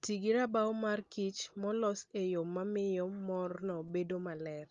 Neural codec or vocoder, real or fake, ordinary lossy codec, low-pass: none; real; Opus, 32 kbps; 7.2 kHz